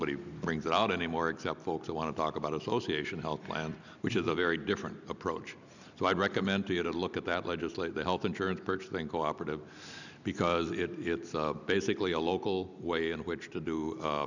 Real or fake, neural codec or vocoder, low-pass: real; none; 7.2 kHz